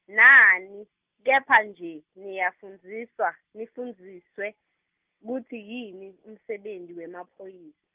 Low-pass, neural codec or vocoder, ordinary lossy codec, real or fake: 3.6 kHz; none; Opus, 32 kbps; real